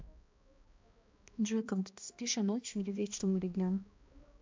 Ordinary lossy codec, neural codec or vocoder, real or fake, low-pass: MP3, 64 kbps; codec, 16 kHz, 1 kbps, X-Codec, HuBERT features, trained on balanced general audio; fake; 7.2 kHz